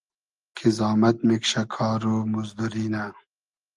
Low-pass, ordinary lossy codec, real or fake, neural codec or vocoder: 10.8 kHz; Opus, 24 kbps; real; none